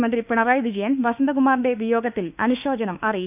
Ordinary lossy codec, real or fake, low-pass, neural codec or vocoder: none; fake; 3.6 kHz; autoencoder, 48 kHz, 32 numbers a frame, DAC-VAE, trained on Japanese speech